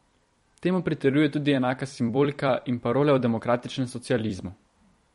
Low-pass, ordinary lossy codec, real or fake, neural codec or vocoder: 19.8 kHz; MP3, 48 kbps; fake; vocoder, 44.1 kHz, 128 mel bands every 256 samples, BigVGAN v2